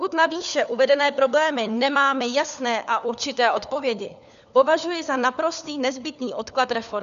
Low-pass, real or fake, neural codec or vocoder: 7.2 kHz; fake; codec, 16 kHz, 4 kbps, FunCodec, trained on LibriTTS, 50 frames a second